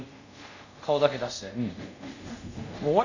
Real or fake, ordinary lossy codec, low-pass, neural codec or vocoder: fake; none; 7.2 kHz; codec, 24 kHz, 0.5 kbps, DualCodec